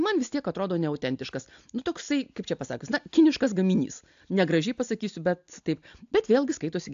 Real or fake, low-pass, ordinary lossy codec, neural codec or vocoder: real; 7.2 kHz; AAC, 64 kbps; none